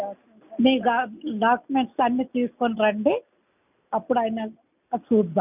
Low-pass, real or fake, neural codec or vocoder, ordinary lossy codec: 3.6 kHz; real; none; none